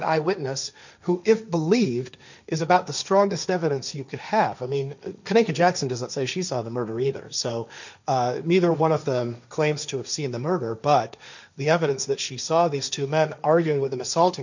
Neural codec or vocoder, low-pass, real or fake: codec, 16 kHz, 1.1 kbps, Voila-Tokenizer; 7.2 kHz; fake